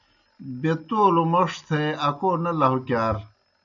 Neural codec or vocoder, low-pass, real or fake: none; 7.2 kHz; real